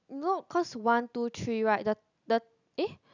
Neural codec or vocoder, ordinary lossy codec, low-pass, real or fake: none; none; 7.2 kHz; real